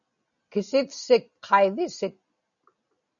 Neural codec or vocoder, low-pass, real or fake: none; 7.2 kHz; real